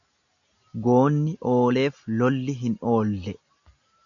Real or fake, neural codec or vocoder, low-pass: real; none; 7.2 kHz